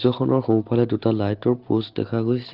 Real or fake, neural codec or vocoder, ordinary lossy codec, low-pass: real; none; Opus, 16 kbps; 5.4 kHz